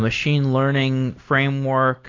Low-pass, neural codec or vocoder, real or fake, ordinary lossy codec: 7.2 kHz; none; real; AAC, 32 kbps